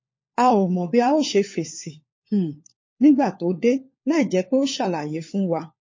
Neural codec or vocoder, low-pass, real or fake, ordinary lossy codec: codec, 16 kHz, 4 kbps, FunCodec, trained on LibriTTS, 50 frames a second; 7.2 kHz; fake; MP3, 32 kbps